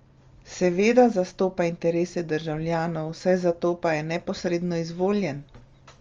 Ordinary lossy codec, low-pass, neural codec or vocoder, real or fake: Opus, 32 kbps; 7.2 kHz; none; real